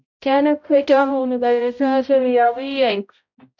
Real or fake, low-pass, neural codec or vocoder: fake; 7.2 kHz; codec, 16 kHz, 0.5 kbps, X-Codec, HuBERT features, trained on balanced general audio